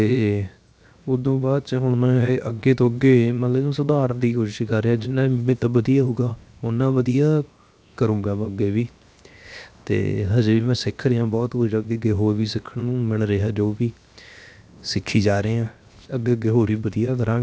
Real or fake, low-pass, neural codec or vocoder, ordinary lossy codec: fake; none; codec, 16 kHz, 0.7 kbps, FocalCodec; none